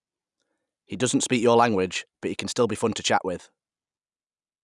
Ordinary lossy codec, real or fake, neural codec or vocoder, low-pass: none; real; none; 10.8 kHz